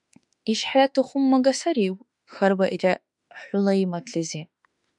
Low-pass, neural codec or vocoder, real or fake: 10.8 kHz; autoencoder, 48 kHz, 32 numbers a frame, DAC-VAE, trained on Japanese speech; fake